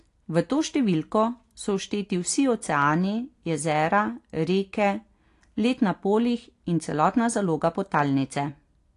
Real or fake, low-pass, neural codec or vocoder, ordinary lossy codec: real; 10.8 kHz; none; AAC, 48 kbps